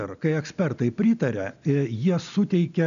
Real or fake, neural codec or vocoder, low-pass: real; none; 7.2 kHz